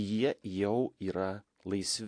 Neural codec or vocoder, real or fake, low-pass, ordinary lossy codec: none; real; 9.9 kHz; AAC, 48 kbps